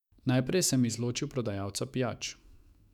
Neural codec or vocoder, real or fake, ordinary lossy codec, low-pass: autoencoder, 48 kHz, 128 numbers a frame, DAC-VAE, trained on Japanese speech; fake; none; 19.8 kHz